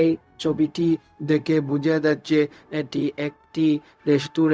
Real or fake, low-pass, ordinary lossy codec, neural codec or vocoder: fake; none; none; codec, 16 kHz, 0.4 kbps, LongCat-Audio-Codec